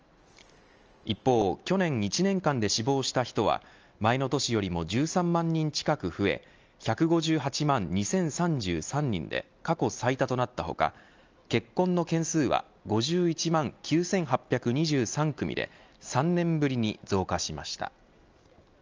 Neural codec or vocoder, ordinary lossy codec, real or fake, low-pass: none; Opus, 24 kbps; real; 7.2 kHz